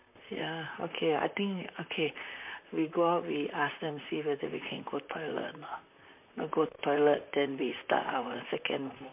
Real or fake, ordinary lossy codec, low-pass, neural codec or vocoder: fake; MP3, 32 kbps; 3.6 kHz; vocoder, 44.1 kHz, 128 mel bands, Pupu-Vocoder